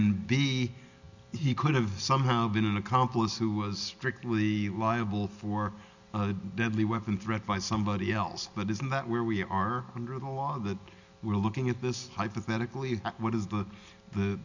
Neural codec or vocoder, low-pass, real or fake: none; 7.2 kHz; real